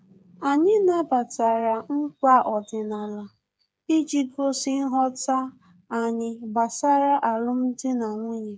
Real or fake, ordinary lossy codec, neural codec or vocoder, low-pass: fake; none; codec, 16 kHz, 8 kbps, FreqCodec, smaller model; none